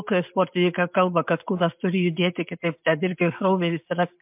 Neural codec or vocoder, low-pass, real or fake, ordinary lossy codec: none; 3.6 kHz; real; MP3, 32 kbps